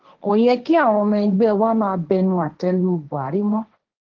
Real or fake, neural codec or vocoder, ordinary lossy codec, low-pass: fake; codec, 16 kHz, 1.1 kbps, Voila-Tokenizer; Opus, 16 kbps; 7.2 kHz